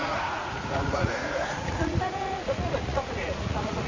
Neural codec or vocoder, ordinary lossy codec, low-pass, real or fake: vocoder, 44.1 kHz, 80 mel bands, Vocos; MP3, 48 kbps; 7.2 kHz; fake